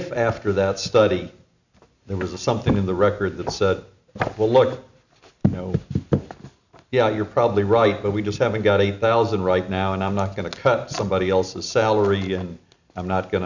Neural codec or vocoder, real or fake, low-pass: none; real; 7.2 kHz